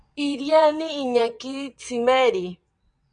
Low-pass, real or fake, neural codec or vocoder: 9.9 kHz; fake; vocoder, 22.05 kHz, 80 mel bands, WaveNeXt